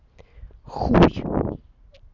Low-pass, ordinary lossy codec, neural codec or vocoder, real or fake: 7.2 kHz; none; none; real